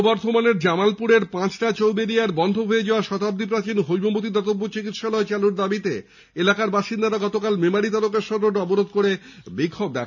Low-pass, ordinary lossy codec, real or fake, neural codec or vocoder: 7.2 kHz; none; real; none